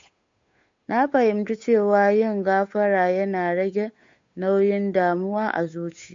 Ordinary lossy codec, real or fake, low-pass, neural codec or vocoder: MP3, 48 kbps; fake; 7.2 kHz; codec, 16 kHz, 8 kbps, FunCodec, trained on Chinese and English, 25 frames a second